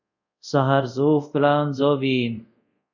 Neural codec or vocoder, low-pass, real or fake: codec, 24 kHz, 0.5 kbps, DualCodec; 7.2 kHz; fake